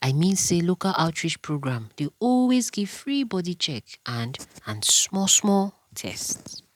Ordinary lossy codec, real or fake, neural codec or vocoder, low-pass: none; real; none; 19.8 kHz